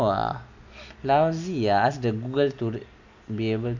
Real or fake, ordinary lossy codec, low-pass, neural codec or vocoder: real; none; 7.2 kHz; none